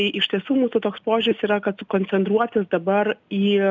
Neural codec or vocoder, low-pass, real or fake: none; 7.2 kHz; real